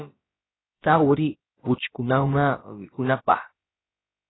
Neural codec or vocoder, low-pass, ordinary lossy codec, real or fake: codec, 16 kHz, about 1 kbps, DyCAST, with the encoder's durations; 7.2 kHz; AAC, 16 kbps; fake